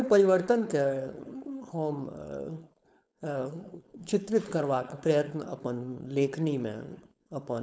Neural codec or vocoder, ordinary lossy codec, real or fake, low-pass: codec, 16 kHz, 4.8 kbps, FACodec; none; fake; none